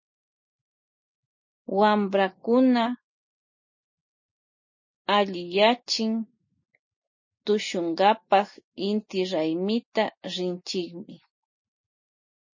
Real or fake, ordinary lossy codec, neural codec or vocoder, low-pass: real; MP3, 32 kbps; none; 7.2 kHz